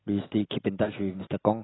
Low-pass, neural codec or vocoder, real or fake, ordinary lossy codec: 7.2 kHz; none; real; AAC, 16 kbps